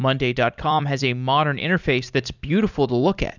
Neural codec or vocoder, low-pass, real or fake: vocoder, 44.1 kHz, 128 mel bands every 256 samples, BigVGAN v2; 7.2 kHz; fake